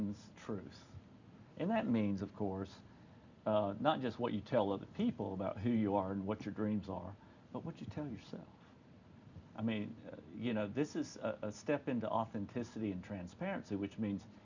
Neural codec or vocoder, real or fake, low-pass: none; real; 7.2 kHz